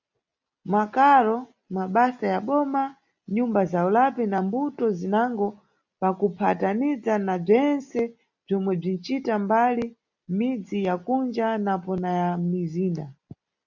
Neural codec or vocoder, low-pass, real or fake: none; 7.2 kHz; real